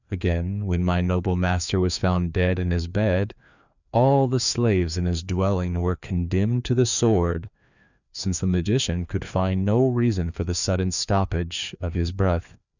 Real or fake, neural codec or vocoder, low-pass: fake; codec, 16 kHz, 2 kbps, FreqCodec, larger model; 7.2 kHz